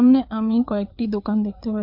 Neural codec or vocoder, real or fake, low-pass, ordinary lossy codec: codec, 16 kHz, 4 kbps, FreqCodec, larger model; fake; 5.4 kHz; MP3, 48 kbps